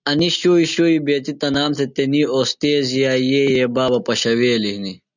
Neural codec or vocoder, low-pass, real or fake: none; 7.2 kHz; real